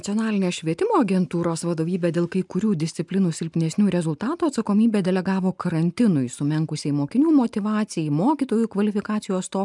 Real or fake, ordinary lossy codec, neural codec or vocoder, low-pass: real; MP3, 96 kbps; none; 10.8 kHz